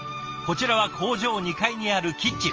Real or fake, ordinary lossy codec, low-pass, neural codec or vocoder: real; Opus, 24 kbps; 7.2 kHz; none